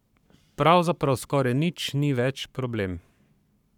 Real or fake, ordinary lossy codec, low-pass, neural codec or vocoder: fake; none; 19.8 kHz; codec, 44.1 kHz, 7.8 kbps, Pupu-Codec